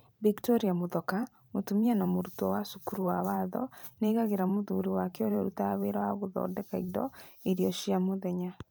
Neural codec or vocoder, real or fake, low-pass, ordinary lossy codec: vocoder, 44.1 kHz, 128 mel bands every 512 samples, BigVGAN v2; fake; none; none